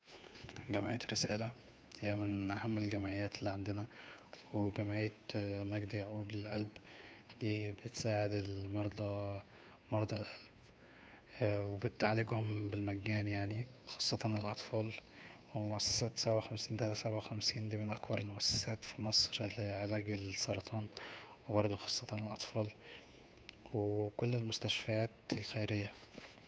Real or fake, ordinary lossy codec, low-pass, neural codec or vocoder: fake; none; none; codec, 16 kHz, 2 kbps, FunCodec, trained on Chinese and English, 25 frames a second